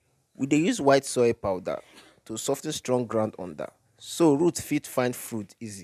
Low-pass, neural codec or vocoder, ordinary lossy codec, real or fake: 14.4 kHz; none; MP3, 96 kbps; real